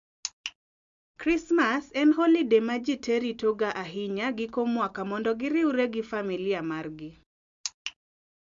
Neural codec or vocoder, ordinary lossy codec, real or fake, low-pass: none; MP3, 64 kbps; real; 7.2 kHz